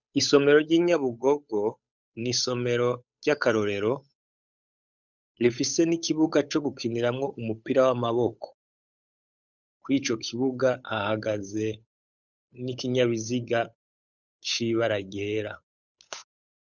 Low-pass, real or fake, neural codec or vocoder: 7.2 kHz; fake; codec, 16 kHz, 8 kbps, FunCodec, trained on Chinese and English, 25 frames a second